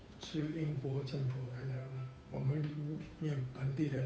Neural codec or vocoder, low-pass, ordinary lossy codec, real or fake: codec, 16 kHz, 2 kbps, FunCodec, trained on Chinese and English, 25 frames a second; none; none; fake